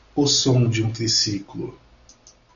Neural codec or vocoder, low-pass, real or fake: none; 7.2 kHz; real